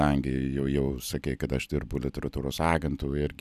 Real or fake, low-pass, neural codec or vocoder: real; 14.4 kHz; none